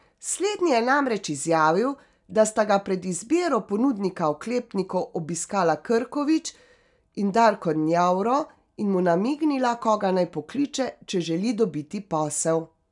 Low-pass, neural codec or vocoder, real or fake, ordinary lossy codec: 10.8 kHz; none; real; none